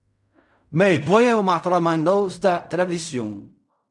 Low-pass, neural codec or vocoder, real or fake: 10.8 kHz; codec, 16 kHz in and 24 kHz out, 0.4 kbps, LongCat-Audio-Codec, fine tuned four codebook decoder; fake